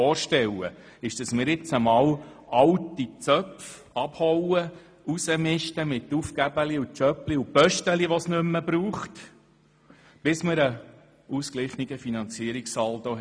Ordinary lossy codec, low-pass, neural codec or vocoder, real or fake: none; none; none; real